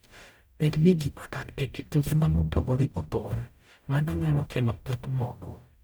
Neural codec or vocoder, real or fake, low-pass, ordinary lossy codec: codec, 44.1 kHz, 0.9 kbps, DAC; fake; none; none